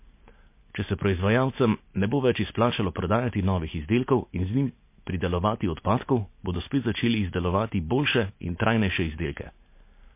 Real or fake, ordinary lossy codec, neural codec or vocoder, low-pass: real; MP3, 24 kbps; none; 3.6 kHz